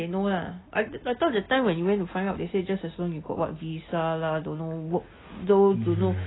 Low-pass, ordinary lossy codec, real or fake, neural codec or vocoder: 7.2 kHz; AAC, 16 kbps; real; none